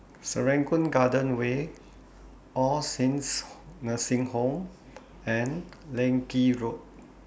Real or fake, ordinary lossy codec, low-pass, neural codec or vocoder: real; none; none; none